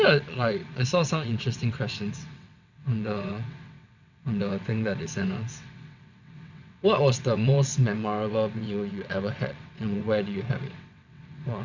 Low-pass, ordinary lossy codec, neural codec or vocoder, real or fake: 7.2 kHz; none; vocoder, 22.05 kHz, 80 mel bands, WaveNeXt; fake